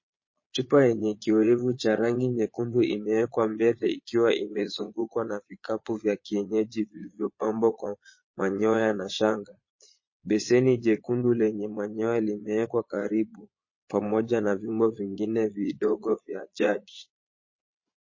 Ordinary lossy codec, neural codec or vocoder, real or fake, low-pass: MP3, 32 kbps; vocoder, 22.05 kHz, 80 mel bands, Vocos; fake; 7.2 kHz